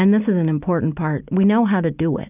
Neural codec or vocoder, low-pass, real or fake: none; 3.6 kHz; real